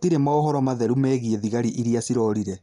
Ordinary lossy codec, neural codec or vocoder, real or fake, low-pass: none; none; real; 10.8 kHz